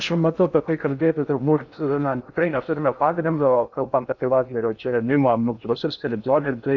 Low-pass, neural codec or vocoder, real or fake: 7.2 kHz; codec, 16 kHz in and 24 kHz out, 0.6 kbps, FocalCodec, streaming, 2048 codes; fake